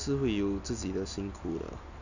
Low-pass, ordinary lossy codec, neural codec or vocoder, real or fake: 7.2 kHz; none; none; real